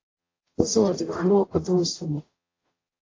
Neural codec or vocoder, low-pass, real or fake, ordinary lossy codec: codec, 44.1 kHz, 0.9 kbps, DAC; 7.2 kHz; fake; AAC, 32 kbps